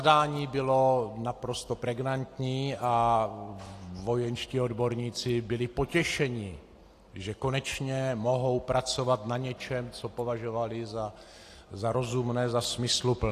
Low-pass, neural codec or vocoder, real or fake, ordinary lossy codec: 14.4 kHz; none; real; AAC, 48 kbps